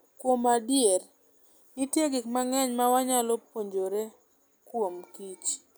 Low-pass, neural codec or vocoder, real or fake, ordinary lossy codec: none; none; real; none